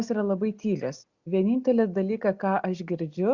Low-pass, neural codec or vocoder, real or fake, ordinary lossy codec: 7.2 kHz; none; real; Opus, 64 kbps